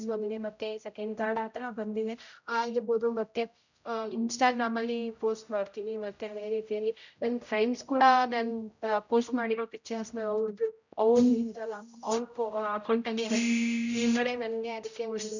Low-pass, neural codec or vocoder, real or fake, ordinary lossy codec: 7.2 kHz; codec, 16 kHz, 0.5 kbps, X-Codec, HuBERT features, trained on general audio; fake; none